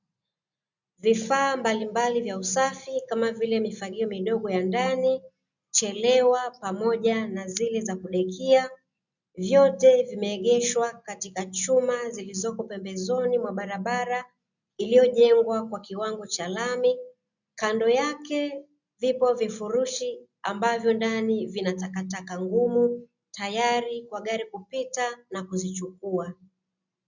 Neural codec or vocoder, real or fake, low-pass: none; real; 7.2 kHz